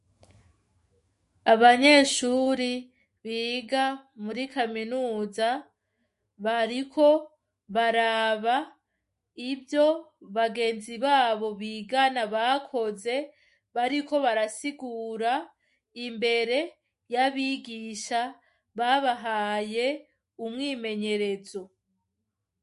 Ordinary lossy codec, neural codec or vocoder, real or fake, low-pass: MP3, 48 kbps; autoencoder, 48 kHz, 128 numbers a frame, DAC-VAE, trained on Japanese speech; fake; 14.4 kHz